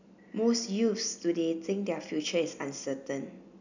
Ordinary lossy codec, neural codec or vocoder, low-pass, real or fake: none; none; 7.2 kHz; real